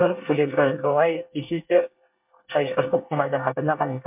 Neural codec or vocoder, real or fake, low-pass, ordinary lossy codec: codec, 24 kHz, 1 kbps, SNAC; fake; 3.6 kHz; MP3, 32 kbps